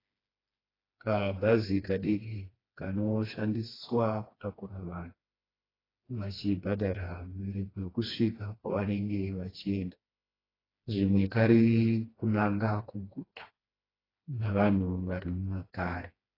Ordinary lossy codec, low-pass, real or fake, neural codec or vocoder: AAC, 24 kbps; 5.4 kHz; fake; codec, 16 kHz, 2 kbps, FreqCodec, smaller model